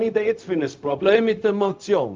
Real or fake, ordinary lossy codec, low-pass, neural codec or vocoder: fake; Opus, 24 kbps; 7.2 kHz; codec, 16 kHz, 0.4 kbps, LongCat-Audio-Codec